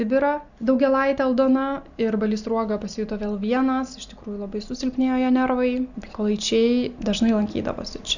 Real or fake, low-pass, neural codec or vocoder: real; 7.2 kHz; none